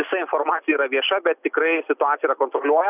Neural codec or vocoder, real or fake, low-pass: none; real; 3.6 kHz